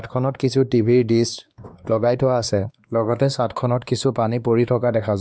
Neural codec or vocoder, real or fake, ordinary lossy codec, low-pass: codec, 16 kHz, 2 kbps, X-Codec, WavLM features, trained on Multilingual LibriSpeech; fake; none; none